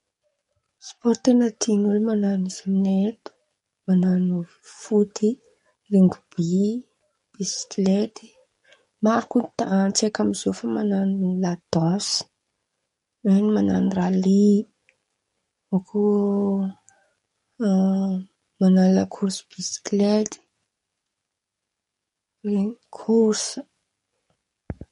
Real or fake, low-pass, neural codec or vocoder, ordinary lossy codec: fake; 19.8 kHz; codec, 44.1 kHz, 7.8 kbps, DAC; MP3, 48 kbps